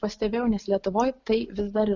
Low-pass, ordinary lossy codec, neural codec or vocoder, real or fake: 7.2 kHz; Opus, 64 kbps; none; real